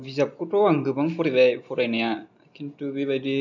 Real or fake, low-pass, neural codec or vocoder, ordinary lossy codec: real; 7.2 kHz; none; none